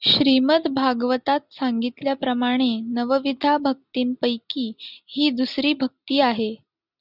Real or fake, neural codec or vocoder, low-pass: real; none; 5.4 kHz